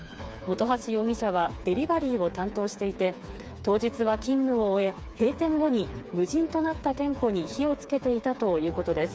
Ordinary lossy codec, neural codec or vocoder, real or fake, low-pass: none; codec, 16 kHz, 4 kbps, FreqCodec, smaller model; fake; none